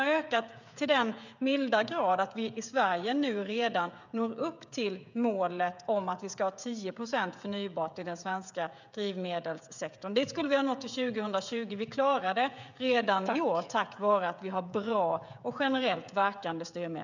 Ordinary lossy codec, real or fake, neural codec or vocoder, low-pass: none; fake; codec, 16 kHz, 16 kbps, FreqCodec, smaller model; 7.2 kHz